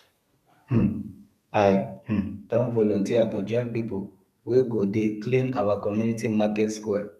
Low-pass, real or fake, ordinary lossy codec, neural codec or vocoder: 14.4 kHz; fake; none; codec, 32 kHz, 1.9 kbps, SNAC